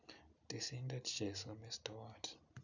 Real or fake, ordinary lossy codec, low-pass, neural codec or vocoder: real; none; 7.2 kHz; none